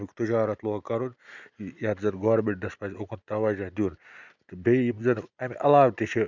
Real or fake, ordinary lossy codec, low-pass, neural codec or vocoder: real; none; 7.2 kHz; none